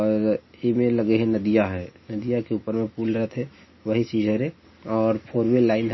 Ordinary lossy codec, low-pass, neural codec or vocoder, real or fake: MP3, 24 kbps; 7.2 kHz; none; real